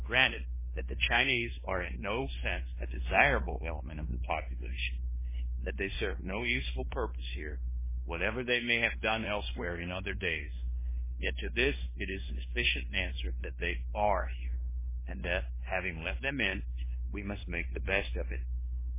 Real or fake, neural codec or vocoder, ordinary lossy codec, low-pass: fake; codec, 16 kHz in and 24 kHz out, 0.9 kbps, LongCat-Audio-Codec, fine tuned four codebook decoder; MP3, 16 kbps; 3.6 kHz